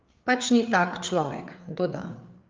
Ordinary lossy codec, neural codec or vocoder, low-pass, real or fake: Opus, 24 kbps; codec, 16 kHz, 4 kbps, FreqCodec, larger model; 7.2 kHz; fake